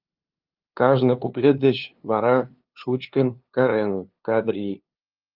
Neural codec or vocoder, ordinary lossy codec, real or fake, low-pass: codec, 16 kHz, 2 kbps, FunCodec, trained on LibriTTS, 25 frames a second; Opus, 32 kbps; fake; 5.4 kHz